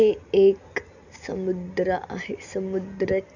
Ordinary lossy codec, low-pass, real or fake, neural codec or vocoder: none; 7.2 kHz; real; none